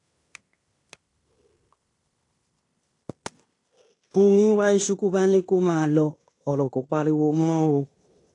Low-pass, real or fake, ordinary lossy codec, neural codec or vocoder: 10.8 kHz; fake; AAC, 48 kbps; codec, 16 kHz in and 24 kHz out, 0.9 kbps, LongCat-Audio-Codec, fine tuned four codebook decoder